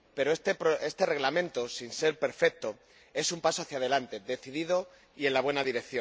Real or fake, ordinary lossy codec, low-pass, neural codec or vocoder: real; none; none; none